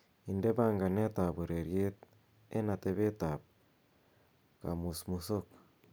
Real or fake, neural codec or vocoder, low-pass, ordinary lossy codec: real; none; none; none